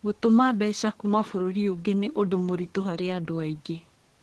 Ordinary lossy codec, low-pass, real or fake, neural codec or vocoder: Opus, 16 kbps; 10.8 kHz; fake; codec, 24 kHz, 1 kbps, SNAC